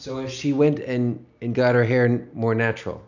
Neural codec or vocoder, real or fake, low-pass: none; real; 7.2 kHz